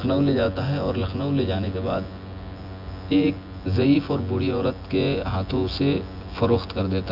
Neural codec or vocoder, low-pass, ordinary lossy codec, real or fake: vocoder, 24 kHz, 100 mel bands, Vocos; 5.4 kHz; Opus, 64 kbps; fake